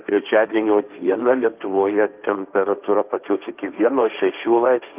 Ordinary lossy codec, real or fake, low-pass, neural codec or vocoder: Opus, 24 kbps; fake; 3.6 kHz; codec, 16 kHz, 1.1 kbps, Voila-Tokenizer